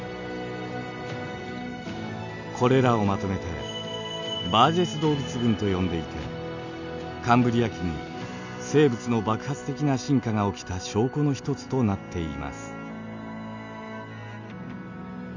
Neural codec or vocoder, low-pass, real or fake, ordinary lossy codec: none; 7.2 kHz; real; none